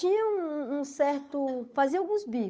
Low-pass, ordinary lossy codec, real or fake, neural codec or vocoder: none; none; fake; codec, 16 kHz, 8 kbps, FunCodec, trained on Chinese and English, 25 frames a second